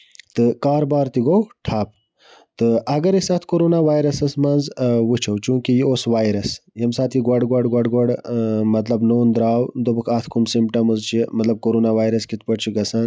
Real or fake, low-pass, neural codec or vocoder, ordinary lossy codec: real; none; none; none